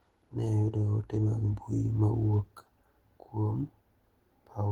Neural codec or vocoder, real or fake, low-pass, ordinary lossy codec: none; real; 19.8 kHz; Opus, 16 kbps